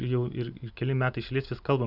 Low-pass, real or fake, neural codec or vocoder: 5.4 kHz; real; none